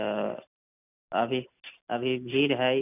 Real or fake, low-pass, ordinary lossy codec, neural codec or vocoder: real; 3.6 kHz; none; none